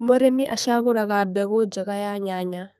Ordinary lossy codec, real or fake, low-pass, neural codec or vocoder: none; fake; 14.4 kHz; codec, 32 kHz, 1.9 kbps, SNAC